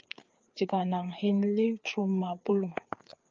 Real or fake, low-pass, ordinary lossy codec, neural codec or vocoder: fake; 7.2 kHz; Opus, 24 kbps; codec, 16 kHz, 4 kbps, FreqCodec, larger model